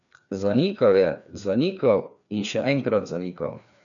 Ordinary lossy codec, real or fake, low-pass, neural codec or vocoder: none; fake; 7.2 kHz; codec, 16 kHz, 2 kbps, FreqCodec, larger model